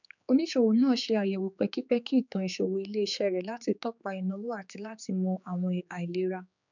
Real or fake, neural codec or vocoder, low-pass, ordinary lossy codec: fake; codec, 16 kHz, 4 kbps, X-Codec, HuBERT features, trained on general audio; 7.2 kHz; none